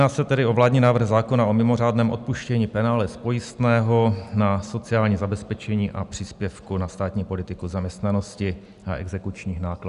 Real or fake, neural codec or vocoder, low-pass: real; none; 10.8 kHz